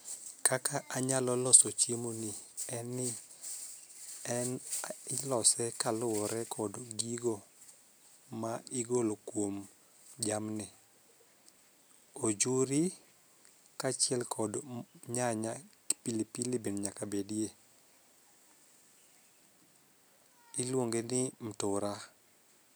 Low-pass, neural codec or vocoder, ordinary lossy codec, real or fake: none; none; none; real